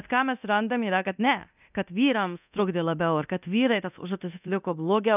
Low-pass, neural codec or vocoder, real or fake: 3.6 kHz; codec, 24 kHz, 0.9 kbps, DualCodec; fake